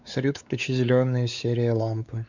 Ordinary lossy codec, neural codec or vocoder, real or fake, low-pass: none; codec, 16 kHz, 4 kbps, X-Codec, WavLM features, trained on Multilingual LibriSpeech; fake; 7.2 kHz